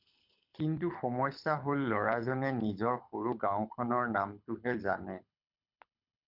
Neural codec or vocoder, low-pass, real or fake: codec, 24 kHz, 6 kbps, HILCodec; 5.4 kHz; fake